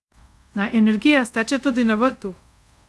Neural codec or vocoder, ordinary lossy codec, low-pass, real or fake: codec, 24 kHz, 0.5 kbps, DualCodec; none; none; fake